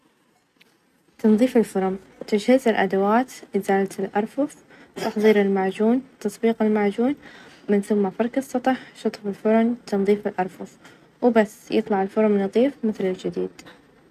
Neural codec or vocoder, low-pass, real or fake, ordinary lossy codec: none; 14.4 kHz; real; none